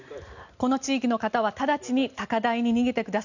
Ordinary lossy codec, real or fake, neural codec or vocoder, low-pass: none; real; none; 7.2 kHz